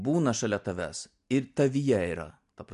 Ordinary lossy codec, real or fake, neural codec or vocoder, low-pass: MP3, 64 kbps; real; none; 10.8 kHz